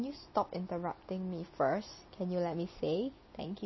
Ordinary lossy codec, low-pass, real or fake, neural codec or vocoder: MP3, 24 kbps; 7.2 kHz; real; none